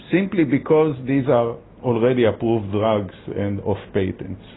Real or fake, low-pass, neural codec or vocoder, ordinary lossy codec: real; 7.2 kHz; none; AAC, 16 kbps